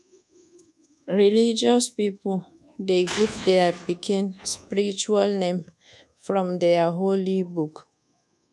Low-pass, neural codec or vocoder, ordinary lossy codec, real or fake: none; codec, 24 kHz, 1.2 kbps, DualCodec; none; fake